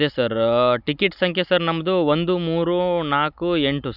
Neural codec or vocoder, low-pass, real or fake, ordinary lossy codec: none; 5.4 kHz; real; none